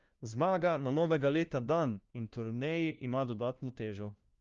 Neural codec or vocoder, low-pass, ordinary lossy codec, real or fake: codec, 16 kHz, 1 kbps, FunCodec, trained on LibriTTS, 50 frames a second; 7.2 kHz; Opus, 32 kbps; fake